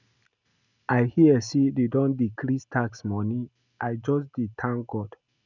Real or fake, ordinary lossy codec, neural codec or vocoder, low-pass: real; none; none; 7.2 kHz